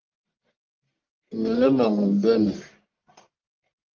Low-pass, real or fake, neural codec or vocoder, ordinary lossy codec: 7.2 kHz; fake; codec, 44.1 kHz, 1.7 kbps, Pupu-Codec; Opus, 32 kbps